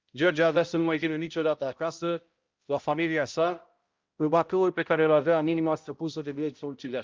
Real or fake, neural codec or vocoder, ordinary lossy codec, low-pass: fake; codec, 16 kHz, 0.5 kbps, X-Codec, HuBERT features, trained on balanced general audio; Opus, 24 kbps; 7.2 kHz